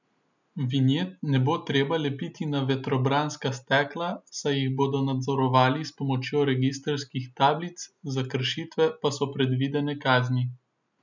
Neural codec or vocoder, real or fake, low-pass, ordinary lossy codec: none; real; 7.2 kHz; none